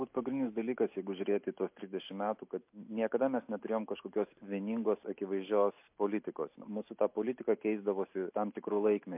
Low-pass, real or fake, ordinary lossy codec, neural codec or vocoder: 3.6 kHz; real; MP3, 32 kbps; none